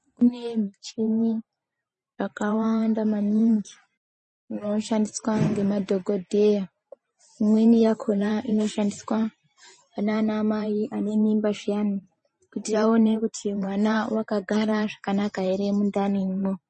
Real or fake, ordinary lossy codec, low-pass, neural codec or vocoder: fake; MP3, 32 kbps; 10.8 kHz; vocoder, 44.1 kHz, 128 mel bands every 512 samples, BigVGAN v2